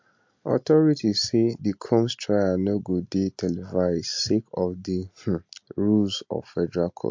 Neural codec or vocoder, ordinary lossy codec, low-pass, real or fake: none; MP3, 48 kbps; 7.2 kHz; real